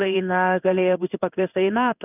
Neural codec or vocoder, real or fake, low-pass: codec, 16 kHz in and 24 kHz out, 1 kbps, XY-Tokenizer; fake; 3.6 kHz